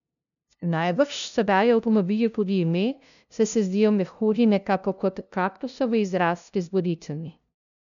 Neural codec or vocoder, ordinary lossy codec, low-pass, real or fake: codec, 16 kHz, 0.5 kbps, FunCodec, trained on LibriTTS, 25 frames a second; none; 7.2 kHz; fake